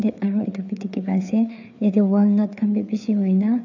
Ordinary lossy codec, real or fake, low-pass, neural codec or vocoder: none; fake; 7.2 kHz; codec, 16 kHz, 4 kbps, FreqCodec, larger model